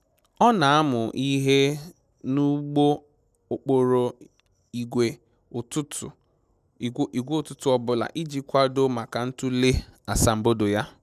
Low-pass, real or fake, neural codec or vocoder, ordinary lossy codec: 14.4 kHz; real; none; none